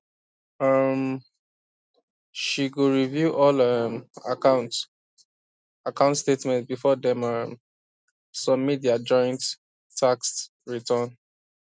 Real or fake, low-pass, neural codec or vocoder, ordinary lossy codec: real; none; none; none